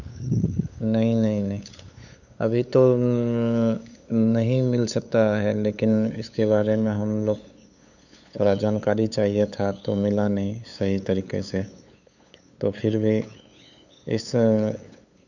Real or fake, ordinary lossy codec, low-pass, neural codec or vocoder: fake; none; 7.2 kHz; codec, 16 kHz, 8 kbps, FunCodec, trained on LibriTTS, 25 frames a second